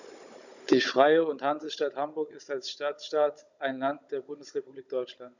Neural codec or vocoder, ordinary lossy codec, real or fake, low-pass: codec, 16 kHz, 16 kbps, FunCodec, trained on Chinese and English, 50 frames a second; none; fake; 7.2 kHz